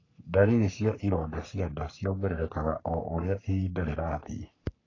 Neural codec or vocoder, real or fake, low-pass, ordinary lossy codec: codec, 44.1 kHz, 3.4 kbps, Pupu-Codec; fake; 7.2 kHz; AAC, 32 kbps